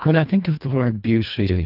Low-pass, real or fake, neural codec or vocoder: 5.4 kHz; fake; codec, 24 kHz, 1.5 kbps, HILCodec